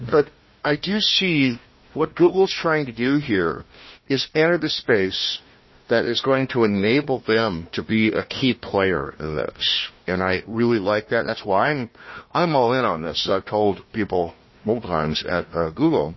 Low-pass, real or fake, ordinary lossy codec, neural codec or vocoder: 7.2 kHz; fake; MP3, 24 kbps; codec, 16 kHz, 1 kbps, FunCodec, trained on Chinese and English, 50 frames a second